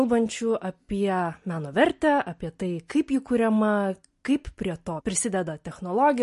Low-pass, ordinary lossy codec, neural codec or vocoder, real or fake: 14.4 kHz; MP3, 48 kbps; none; real